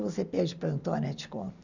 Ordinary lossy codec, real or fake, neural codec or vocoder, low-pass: none; real; none; 7.2 kHz